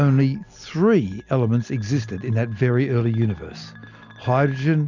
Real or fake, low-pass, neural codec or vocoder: real; 7.2 kHz; none